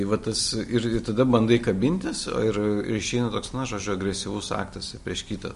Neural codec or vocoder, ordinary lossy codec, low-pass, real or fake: none; MP3, 48 kbps; 14.4 kHz; real